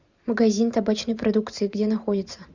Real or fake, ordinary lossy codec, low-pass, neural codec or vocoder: fake; Opus, 64 kbps; 7.2 kHz; vocoder, 44.1 kHz, 128 mel bands every 512 samples, BigVGAN v2